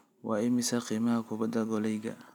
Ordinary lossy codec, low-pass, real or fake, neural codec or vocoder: none; 19.8 kHz; real; none